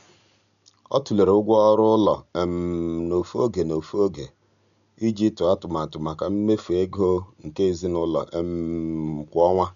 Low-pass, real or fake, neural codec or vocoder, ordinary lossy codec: 7.2 kHz; real; none; none